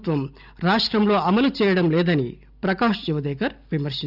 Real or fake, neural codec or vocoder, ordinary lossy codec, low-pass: real; none; none; 5.4 kHz